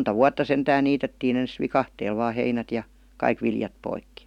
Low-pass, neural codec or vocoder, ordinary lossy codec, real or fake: 19.8 kHz; none; none; real